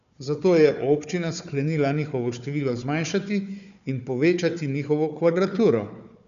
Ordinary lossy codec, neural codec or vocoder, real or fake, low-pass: none; codec, 16 kHz, 4 kbps, FunCodec, trained on Chinese and English, 50 frames a second; fake; 7.2 kHz